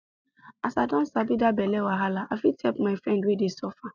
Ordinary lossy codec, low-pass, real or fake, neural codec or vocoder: none; 7.2 kHz; real; none